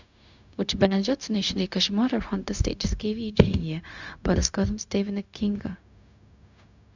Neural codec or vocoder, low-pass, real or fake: codec, 16 kHz, 0.4 kbps, LongCat-Audio-Codec; 7.2 kHz; fake